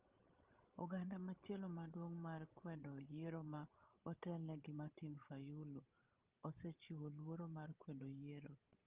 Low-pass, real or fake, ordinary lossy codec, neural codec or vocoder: 3.6 kHz; fake; Opus, 32 kbps; codec, 16 kHz, 16 kbps, FreqCodec, larger model